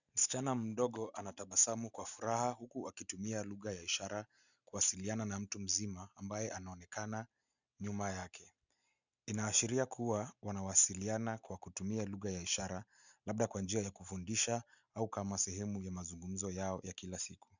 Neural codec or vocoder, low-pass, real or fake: none; 7.2 kHz; real